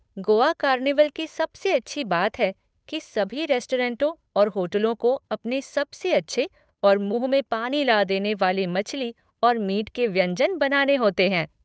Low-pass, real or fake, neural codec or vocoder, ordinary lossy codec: none; fake; codec, 16 kHz, 8 kbps, FunCodec, trained on Chinese and English, 25 frames a second; none